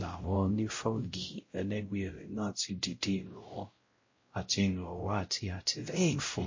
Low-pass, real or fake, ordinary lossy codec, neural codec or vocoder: 7.2 kHz; fake; MP3, 32 kbps; codec, 16 kHz, 0.5 kbps, X-Codec, WavLM features, trained on Multilingual LibriSpeech